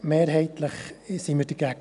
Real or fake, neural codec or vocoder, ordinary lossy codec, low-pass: real; none; none; 10.8 kHz